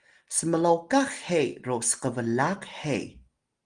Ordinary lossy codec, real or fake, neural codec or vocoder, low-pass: Opus, 24 kbps; real; none; 9.9 kHz